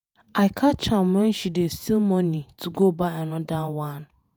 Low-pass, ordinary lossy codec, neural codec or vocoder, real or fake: none; none; vocoder, 48 kHz, 128 mel bands, Vocos; fake